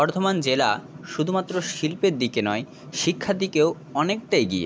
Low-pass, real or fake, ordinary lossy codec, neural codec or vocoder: none; real; none; none